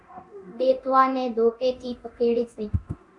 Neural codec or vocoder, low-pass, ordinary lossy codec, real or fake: codec, 24 kHz, 0.9 kbps, DualCodec; 10.8 kHz; MP3, 64 kbps; fake